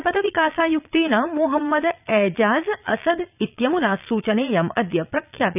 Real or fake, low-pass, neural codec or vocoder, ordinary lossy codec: fake; 3.6 kHz; vocoder, 22.05 kHz, 80 mel bands, Vocos; none